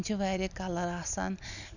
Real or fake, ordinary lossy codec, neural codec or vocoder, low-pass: real; none; none; 7.2 kHz